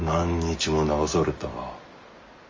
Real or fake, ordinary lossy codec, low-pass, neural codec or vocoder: real; Opus, 32 kbps; 7.2 kHz; none